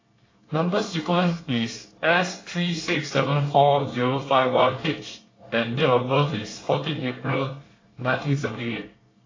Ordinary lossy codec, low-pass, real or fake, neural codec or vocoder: AAC, 32 kbps; 7.2 kHz; fake; codec, 24 kHz, 1 kbps, SNAC